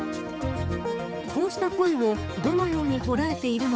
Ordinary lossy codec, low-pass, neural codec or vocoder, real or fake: none; none; codec, 16 kHz, 4 kbps, X-Codec, HuBERT features, trained on balanced general audio; fake